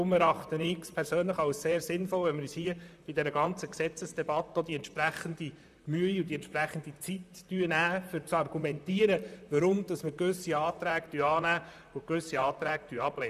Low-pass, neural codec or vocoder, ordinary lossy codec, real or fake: 14.4 kHz; vocoder, 44.1 kHz, 128 mel bands, Pupu-Vocoder; none; fake